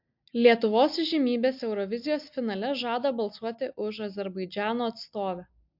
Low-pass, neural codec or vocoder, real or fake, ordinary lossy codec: 5.4 kHz; none; real; MP3, 48 kbps